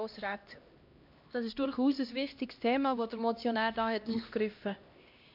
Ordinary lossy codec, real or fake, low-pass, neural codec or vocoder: MP3, 48 kbps; fake; 5.4 kHz; codec, 16 kHz, 1 kbps, X-Codec, HuBERT features, trained on LibriSpeech